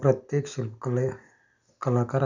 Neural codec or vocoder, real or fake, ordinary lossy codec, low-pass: none; real; none; 7.2 kHz